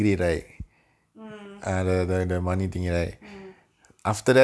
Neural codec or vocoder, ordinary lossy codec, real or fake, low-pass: none; none; real; none